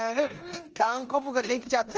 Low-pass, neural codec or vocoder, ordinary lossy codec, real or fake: 7.2 kHz; codec, 16 kHz in and 24 kHz out, 0.9 kbps, LongCat-Audio-Codec, four codebook decoder; Opus, 24 kbps; fake